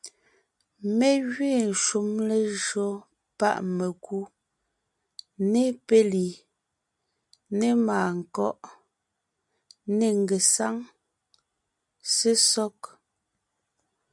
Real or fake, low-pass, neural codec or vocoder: real; 10.8 kHz; none